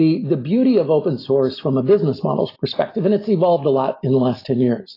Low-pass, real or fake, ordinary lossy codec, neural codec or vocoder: 5.4 kHz; real; AAC, 24 kbps; none